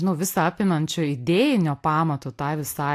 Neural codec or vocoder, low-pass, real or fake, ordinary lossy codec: none; 14.4 kHz; real; AAC, 64 kbps